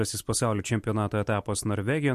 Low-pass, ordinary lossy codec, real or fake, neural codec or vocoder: 14.4 kHz; MP3, 64 kbps; real; none